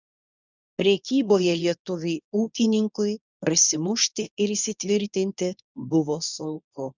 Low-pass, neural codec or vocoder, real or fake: 7.2 kHz; codec, 24 kHz, 0.9 kbps, WavTokenizer, medium speech release version 2; fake